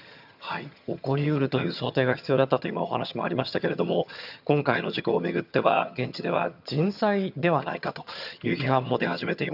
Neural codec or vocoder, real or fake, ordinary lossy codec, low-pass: vocoder, 22.05 kHz, 80 mel bands, HiFi-GAN; fake; none; 5.4 kHz